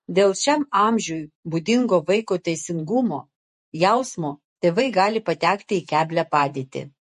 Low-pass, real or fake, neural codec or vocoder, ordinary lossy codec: 14.4 kHz; fake; vocoder, 48 kHz, 128 mel bands, Vocos; MP3, 48 kbps